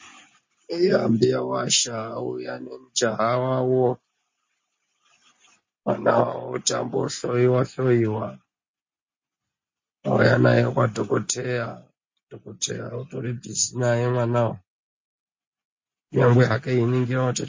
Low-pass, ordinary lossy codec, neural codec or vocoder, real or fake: 7.2 kHz; MP3, 32 kbps; none; real